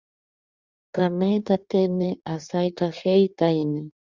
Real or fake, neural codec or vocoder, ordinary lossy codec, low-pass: fake; codec, 16 kHz in and 24 kHz out, 1.1 kbps, FireRedTTS-2 codec; Opus, 64 kbps; 7.2 kHz